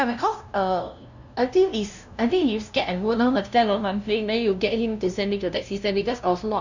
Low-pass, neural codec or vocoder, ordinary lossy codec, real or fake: 7.2 kHz; codec, 16 kHz, 0.5 kbps, FunCodec, trained on LibriTTS, 25 frames a second; none; fake